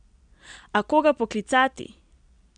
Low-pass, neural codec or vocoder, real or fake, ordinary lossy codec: 9.9 kHz; none; real; none